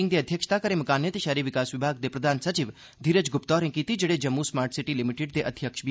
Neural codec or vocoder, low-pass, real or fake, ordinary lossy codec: none; none; real; none